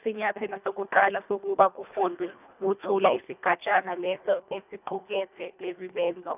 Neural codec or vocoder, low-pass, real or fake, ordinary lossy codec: codec, 24 kHz, 1.5 kbps, HILCodec; 3.6 kHz; fake; none